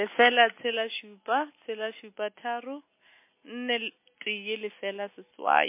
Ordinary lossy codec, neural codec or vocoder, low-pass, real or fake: MP3, 24 kbps; none; 3.6 kHz; real